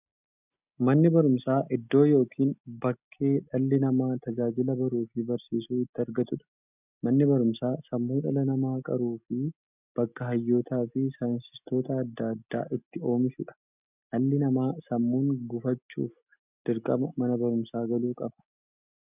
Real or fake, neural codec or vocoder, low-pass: real; none; 3.6 kHz